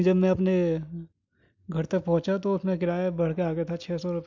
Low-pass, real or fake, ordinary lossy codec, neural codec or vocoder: 7.2 kHz; real; MP3, 64 kbps; none